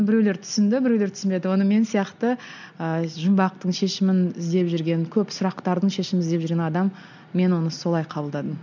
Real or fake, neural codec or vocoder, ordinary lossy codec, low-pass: real; none; none; 7.2 kHz